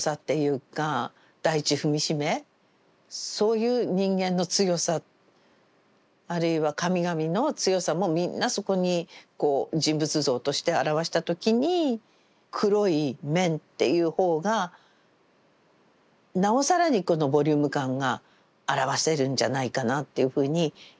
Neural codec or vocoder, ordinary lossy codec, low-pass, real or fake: none; none; none; real